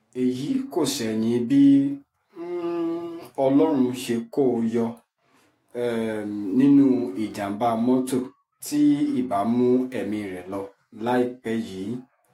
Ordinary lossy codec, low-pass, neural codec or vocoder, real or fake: AAC, 48 kbps; 19.8 kHz; autoencoder, 48 kHz, 128 numbers a frame, DAC-VAE, trained on Japanese speech; fake